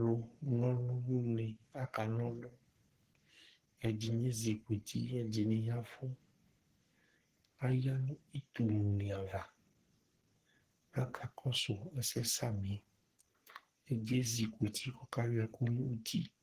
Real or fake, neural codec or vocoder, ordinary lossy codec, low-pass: fake; codec, 44.1 kHz, 3.4 kbps, Pupu-Codec; Opus, 16 kbps; 14.4 kHz